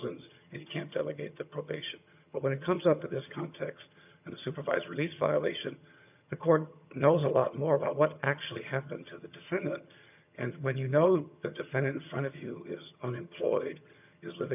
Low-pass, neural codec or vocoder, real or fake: 3.6 kHz; vocoder, 22.05 kHz, 80 mel bands, HiFi-GAN; fake